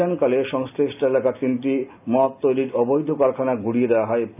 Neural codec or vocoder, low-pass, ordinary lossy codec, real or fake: none; 3.6 kHz; none; real